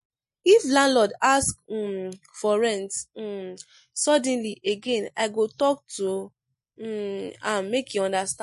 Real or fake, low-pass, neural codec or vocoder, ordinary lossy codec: real; 14.4 kHz; none; MP3, 48 kbps